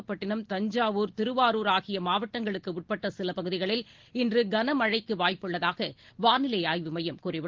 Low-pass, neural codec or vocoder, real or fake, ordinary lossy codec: 7.2 kHz; none; real; Opus, 16 kbps